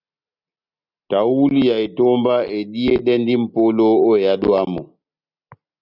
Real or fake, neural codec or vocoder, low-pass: real; none; 5.4 kHz